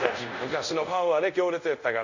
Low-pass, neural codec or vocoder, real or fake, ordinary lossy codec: 7.2 kHz; codec, 24 kHz, 0.5 kbps, DualCodec; fake; none